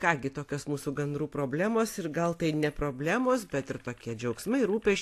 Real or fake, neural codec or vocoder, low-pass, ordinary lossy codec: real; none; 14.4 kHz; AAC, 64 kbps